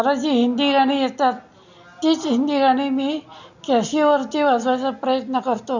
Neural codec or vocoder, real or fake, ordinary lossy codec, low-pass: none; real; none; 7.2 kHz